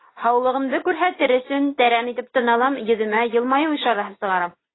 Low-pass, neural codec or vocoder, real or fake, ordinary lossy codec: 7.2 kHz; none; real; AAC, 16 kbps